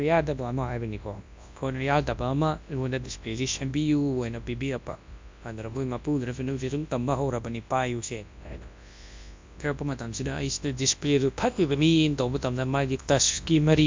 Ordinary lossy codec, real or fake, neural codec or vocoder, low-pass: MP3, 64 kbps; fake; codec, 24 kHz, 0.9 kbps, WavTokenizer, large speech release; 7.2 kHz